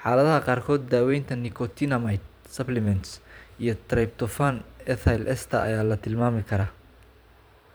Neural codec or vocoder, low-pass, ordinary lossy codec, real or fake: vocoder, 44.1 kHz, 128 mel bands every 512 samples, BigVGAN v2; none; none; fake